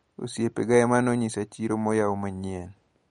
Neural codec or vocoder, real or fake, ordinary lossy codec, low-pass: none; real; MP3, 48 kbps; 19.8 kHz